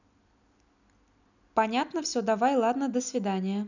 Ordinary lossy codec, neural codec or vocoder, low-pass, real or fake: none; none; 7.2 kHz; real